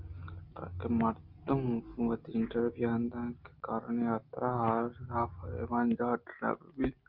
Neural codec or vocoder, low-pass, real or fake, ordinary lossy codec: none; 5.4 kHz; real; Opus, 32 kbps